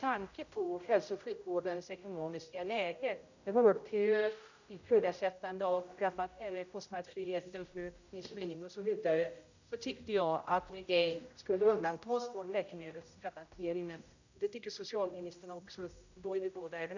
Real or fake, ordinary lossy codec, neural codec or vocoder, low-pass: fake; none; codec, 16 kHz, 0.5 kbps, X-Codec, HuBERT features, trained on general audio; 7.2 kHz